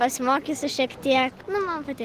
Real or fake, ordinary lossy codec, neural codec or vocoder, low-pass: real; Opus, 16 kbps; none; 14.4 kHz